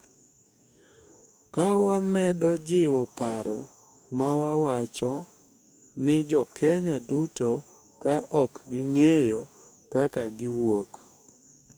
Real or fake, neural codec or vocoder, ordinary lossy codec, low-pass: fake; codec, 44.1 kHz, 2.6 kbps, DAC; none; none